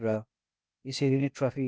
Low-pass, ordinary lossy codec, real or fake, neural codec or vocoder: none; none; fake; codec, 16 kHz, 0.8 kbps, ZipCodec